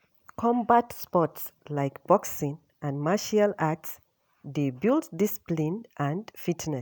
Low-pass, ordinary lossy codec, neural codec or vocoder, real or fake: none; none; none; real